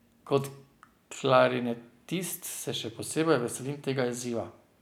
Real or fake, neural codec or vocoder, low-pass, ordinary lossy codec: real; none; none; none